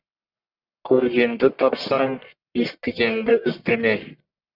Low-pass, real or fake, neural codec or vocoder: 5.4 kHz; fake; codec, 44.1 kHz, 1.7 kbps, Pupu-Codec